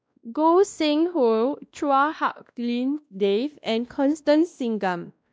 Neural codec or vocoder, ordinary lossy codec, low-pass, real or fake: codec, 16 kHz, 1 kbps, X-Codec, WavLM features, trained on Multilingual LibriSpeech; none; none; fake